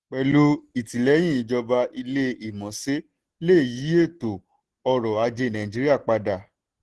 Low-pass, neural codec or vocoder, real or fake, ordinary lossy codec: 10.8 kHz; none; real; Opus, 16 kbps